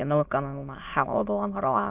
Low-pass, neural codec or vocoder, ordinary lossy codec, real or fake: 3.6 kHz; autoencoder, 22.05 kHz, a latent of 192 numbers a frame, VITS, trained on many speakers; Opus, 32 kbps; fake